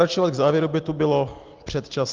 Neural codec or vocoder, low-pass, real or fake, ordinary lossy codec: none; 7.2 kHz; real; Opus, 24 kbps